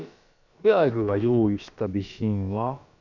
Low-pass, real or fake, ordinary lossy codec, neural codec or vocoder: 7.2 kHz; fake; none; codec, 16 kHz, about 1 kbps, DyCAST, with the encoder's durations